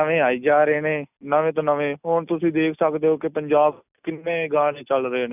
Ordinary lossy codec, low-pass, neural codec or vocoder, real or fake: none; 3.6 kHz; none; real